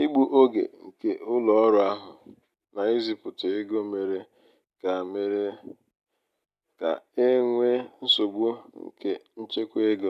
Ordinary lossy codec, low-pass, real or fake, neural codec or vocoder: none; 14.4 kHz; real; none